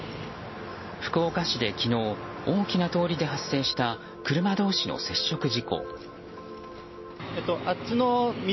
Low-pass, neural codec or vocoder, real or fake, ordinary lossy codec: 7.2 kHz; none; real; MP3, 24 kbps